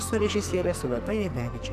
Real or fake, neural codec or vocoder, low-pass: fake; codec, 44.1 kHz, 2.6 kbps, SNAC; 14.4 kHz